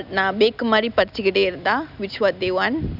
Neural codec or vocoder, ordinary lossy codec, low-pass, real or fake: none; none; 5.4 kHz; real